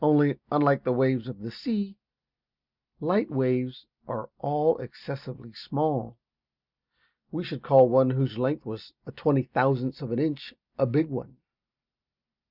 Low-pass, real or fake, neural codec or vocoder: 5.4 kHz; real; none